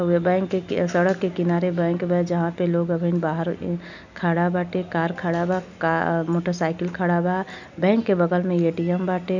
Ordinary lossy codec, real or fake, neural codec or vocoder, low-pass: none; real; none; 7.2 kHz